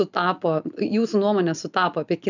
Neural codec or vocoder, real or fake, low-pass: none; real; 7.2 kHz